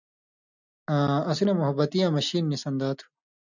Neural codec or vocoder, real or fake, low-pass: none; real; 7.2 kHz